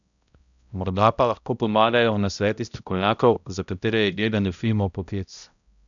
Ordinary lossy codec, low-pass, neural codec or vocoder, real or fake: none; 7.2 kHz; codec, 16 kHz, 0.5 kbps, X-Codec, HuBERT features, trained on balanced general audio; fake